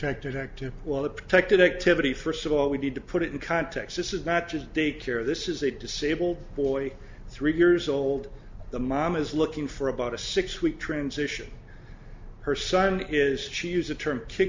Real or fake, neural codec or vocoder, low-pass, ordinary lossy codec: real; none; 7.2 kHz; MP3, 64 kbps